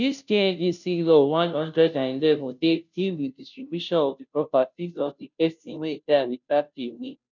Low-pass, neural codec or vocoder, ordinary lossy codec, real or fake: 7.2 kHz; codec, 16 kHz, 0.5 kbps, FunCodec, trained on Chinese and English, 25 frames a second; none; fake